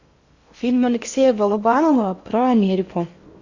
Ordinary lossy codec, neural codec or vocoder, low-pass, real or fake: Opus, 64 kbps; codec, 16 kHz in and 24 kHz out, 0.8 kbps, FocalCodec, streaming, 65536 codes; 7.2 kHz; fake